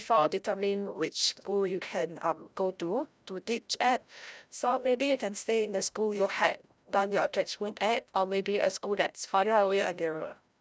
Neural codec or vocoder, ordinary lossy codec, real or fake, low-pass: codec, 16 kHz, 0.5 kbps, FreqCodec, larger model; none; fake; none